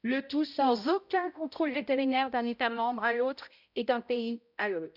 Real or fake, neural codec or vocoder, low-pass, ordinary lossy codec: fake; codec, 16 kHz, 0.5 kbps, X-Codec, HuBERT features, trained on balanced general audio; 5.4 kHz; none